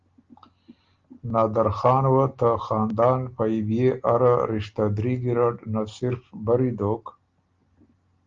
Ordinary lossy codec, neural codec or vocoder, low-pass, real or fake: Opus, 32 kbps; none; 7.2 kHz; real